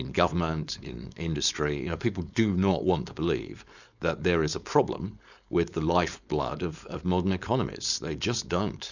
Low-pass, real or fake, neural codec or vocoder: 7.2 kHz; fake; codec, 16 kHz, 4.8 kbps, FACodec